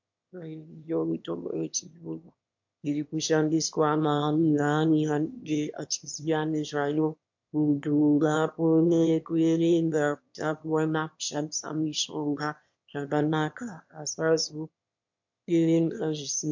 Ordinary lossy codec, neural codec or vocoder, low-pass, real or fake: MP3, 48 kbps; autoencoder, 22.05 kHz, a latent of 192 numbers a frame, VITS, trained on one speaker; 7.2 kHz; fake